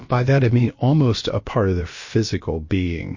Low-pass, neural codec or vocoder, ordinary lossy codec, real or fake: 7.2 kHz; codec, 16 kHz, about 1 kbps, DyCAST, with the encoder's durations; MP3, 32 kbps; fake